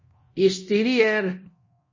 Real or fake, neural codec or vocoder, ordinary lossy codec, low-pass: fake; codec, 24 kHz, 0.9 kbps, DualCodec; MP3, 32 kbps; 7.2 kHz